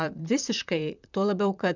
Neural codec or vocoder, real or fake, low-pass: vocoder, 44.1 kHz, 128 mel bands, Pupu-Vocoder; fake; 7.2 kHz